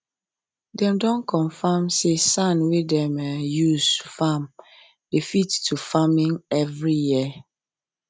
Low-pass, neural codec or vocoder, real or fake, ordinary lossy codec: none; none; real; none